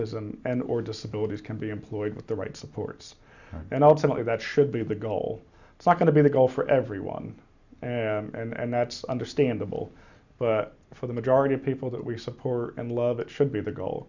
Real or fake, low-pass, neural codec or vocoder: real; 7.2 kHz; none